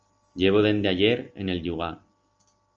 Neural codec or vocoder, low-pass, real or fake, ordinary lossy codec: none; 7.2 kHz; real; Opus, 24 kbps